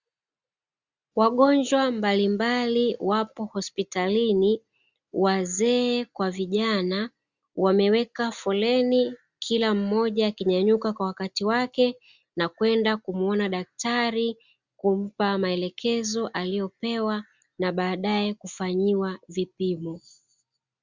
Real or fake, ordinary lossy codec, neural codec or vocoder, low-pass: real; Opus, 64 kbps; none; 7.2 kHz